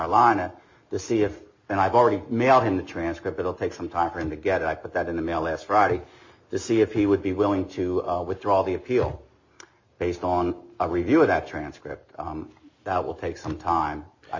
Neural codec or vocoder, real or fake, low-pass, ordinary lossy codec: none; real; 7.2 kHz; MP3, 32 kbps